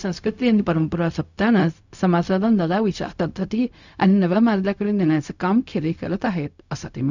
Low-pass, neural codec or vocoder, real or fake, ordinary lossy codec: 7.2 kHz; codec, 16 kHz, 0.4 kbps, LongCat-Audio-Codec; fake; none